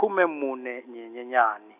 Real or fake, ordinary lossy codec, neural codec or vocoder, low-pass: real; none; none; 3.6 kHz